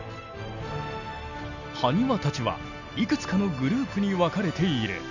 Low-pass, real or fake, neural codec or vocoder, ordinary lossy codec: 7.2 kHz; real; none; none